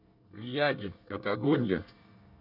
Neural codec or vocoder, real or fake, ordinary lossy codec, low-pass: codec, 24 kHz, 1 kbps, SNAC; fake; none; 5.4 kHz